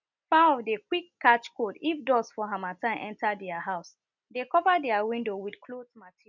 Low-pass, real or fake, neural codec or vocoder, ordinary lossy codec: 7.2 kHz; real; none; none